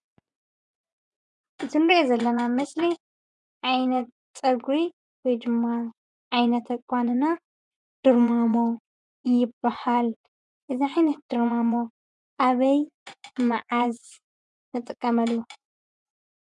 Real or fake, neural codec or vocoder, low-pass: fake; vocoder, 24 kHz, 100 mel bands, Vocos; 10.8 kHz